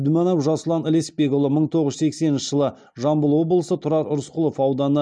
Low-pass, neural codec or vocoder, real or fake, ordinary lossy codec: 9.9 kHz; none; real; none